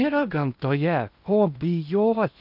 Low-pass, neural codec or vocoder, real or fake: 5.4 kHz; codec, 16 kHz in and 24 kHz out, 0.8 kbps, FocalCodec, streaming, 65536 codes; fake